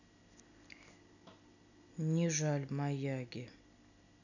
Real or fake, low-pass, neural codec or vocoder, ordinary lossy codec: real; 7.2 kHz; none; none